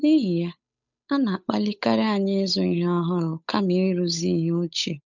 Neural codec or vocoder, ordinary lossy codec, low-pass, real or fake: codec, 16 kHz, 8 kbps, FunCodec, trained on Chinese and English, 25 frames a second; none; 7.2 kHz; fake